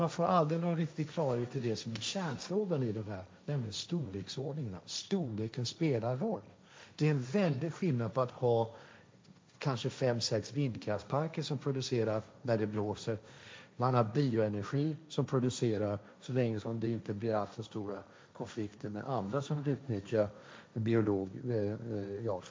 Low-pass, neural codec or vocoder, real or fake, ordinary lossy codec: none; codec, 16 kHz, 1.1 kbps, Voila-Tokenizer; fake; none